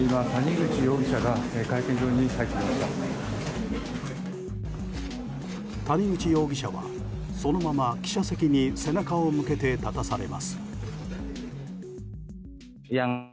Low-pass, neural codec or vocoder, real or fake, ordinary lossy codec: none; none; real; none